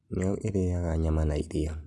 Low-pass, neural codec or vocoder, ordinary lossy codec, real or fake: none; none; none; real